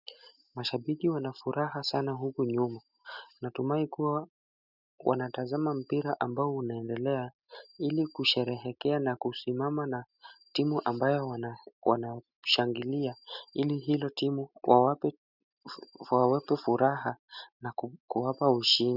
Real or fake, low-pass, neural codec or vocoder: real; 5.4 kHz; none